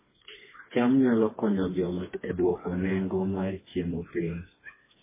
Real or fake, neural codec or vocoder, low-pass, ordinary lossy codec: fake; codec, 16 kHz, 2 kbps, FreqCodec, smaller model; 3.6 kHz; MP3, 16 kbps